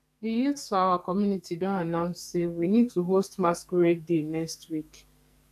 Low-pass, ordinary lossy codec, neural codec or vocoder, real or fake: 14.4 kHz; none; codec, 44.1 kHz, 2.6 kbps, SNAC; fake